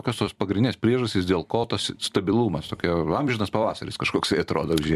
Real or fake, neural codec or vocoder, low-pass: fake; vocoder, 44.1 kHz, 128 mel bands every 256 samples, BigVGAN v2; 14.4 kHz